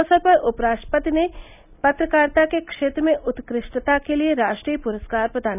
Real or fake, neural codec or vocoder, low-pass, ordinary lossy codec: real; none; 3.6 kHz; none